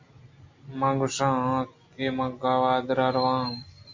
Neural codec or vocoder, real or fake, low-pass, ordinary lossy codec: none; real; 7.2 kHz; MP3, 64 kbps